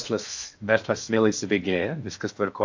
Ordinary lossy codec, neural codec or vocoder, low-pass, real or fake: AAC, 48 kbps; codec, 16 kHz in and 24 kHz out, 0.8 kbps, FocalCodec, streaming, 65536 codes; 7.2 kHz; fake